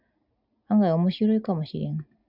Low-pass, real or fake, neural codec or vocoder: 5.4 kHz; real; none